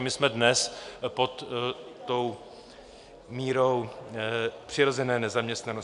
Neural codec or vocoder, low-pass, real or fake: none; 10.8 kHz; real